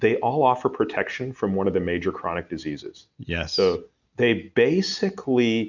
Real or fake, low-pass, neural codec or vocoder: real; 7.2 kHz; none